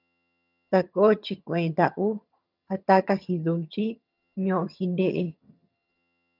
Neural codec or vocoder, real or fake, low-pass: vocoder, 22.05 kHz, 80 mel bands, HiFi-GAN; fake; 5.4 kHz